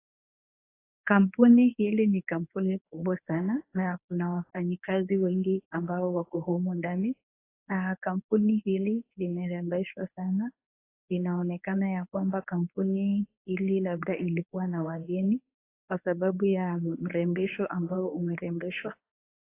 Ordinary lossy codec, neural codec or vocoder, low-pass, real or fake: AAC, 24 kbps; codec, 24 kHz, 0.9 kbps, WavTokenizer, medium speech release version 1; 3.6 kHz; fake